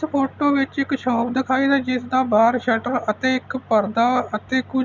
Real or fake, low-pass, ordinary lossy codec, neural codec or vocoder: real; 7.2 kHz; none; none